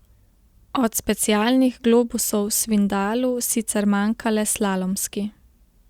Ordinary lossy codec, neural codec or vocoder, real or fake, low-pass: Opus, 64 kbps; none; real; 19.8 kHz